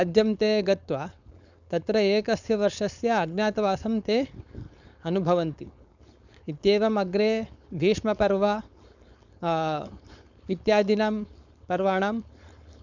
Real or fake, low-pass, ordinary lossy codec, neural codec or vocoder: fake; 7.2 kHz; none; codec, 16 kHz, 4.8 kbps, FACodec